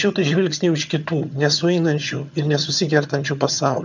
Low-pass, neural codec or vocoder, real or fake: 7.2 kHz; vocoder, 22.05 kHz, 80 mel bands, HiFi-GAN; fake